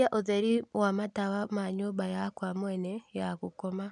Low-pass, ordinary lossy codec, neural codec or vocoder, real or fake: 10.8 kHz; none; none; real